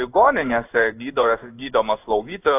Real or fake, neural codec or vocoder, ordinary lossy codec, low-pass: fake; codec, 16 kHz in and 24 kHz out, 1 kbps, XY-Tokenizer; AAC, 32 kbps; 3.6 kHz